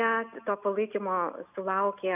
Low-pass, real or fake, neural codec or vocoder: 3.6 kHz; real; none